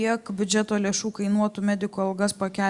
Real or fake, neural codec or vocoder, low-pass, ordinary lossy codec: real; none; 10.8 kHz; Opus, 64 kbps